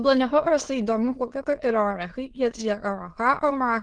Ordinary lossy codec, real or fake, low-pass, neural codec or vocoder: Opus, 16 kbps; fake; 9.9 kHz; autoencoder, 22.05 kHz, a latent of 192 numbers a frame, VITS, trained on many speakers